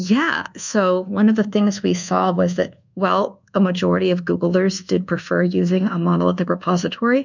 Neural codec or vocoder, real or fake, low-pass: codec, 24 kHz, 1.2 kbps, DualCodec; fake; 7.2 kHz